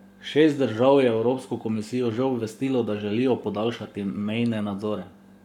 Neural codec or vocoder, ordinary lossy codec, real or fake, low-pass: codec, 44.1 kHz, 7.8 kbps, Pupu-Codec; none; fake; 19.8 kHz